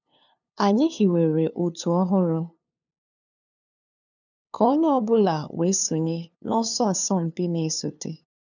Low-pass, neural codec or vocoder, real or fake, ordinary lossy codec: 7.2 kHz; codec, 16 kHz, 2 kbps, FunCodec, trained on LibriTTS, 25 frames a second; fake; none